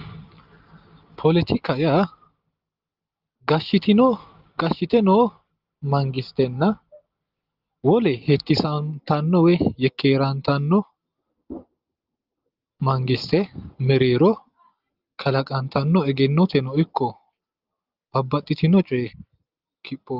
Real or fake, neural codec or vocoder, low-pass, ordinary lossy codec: real; none; 5.4 kHz; Opus, 32 kbps